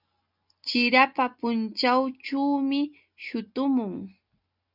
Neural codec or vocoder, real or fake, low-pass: none; real; 5.4 kHz